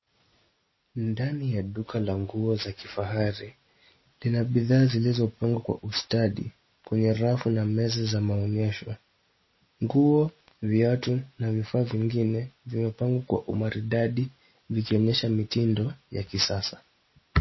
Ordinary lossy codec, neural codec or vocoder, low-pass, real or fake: MP3, 24 kbps; none; 7.2 kHz; real